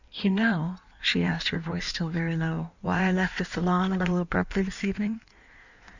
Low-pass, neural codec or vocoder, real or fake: 7.2 kHz; codec, 16 kHz in and 24 kHz out, 1.1 kbps, FireRedTTS-2 codec; fake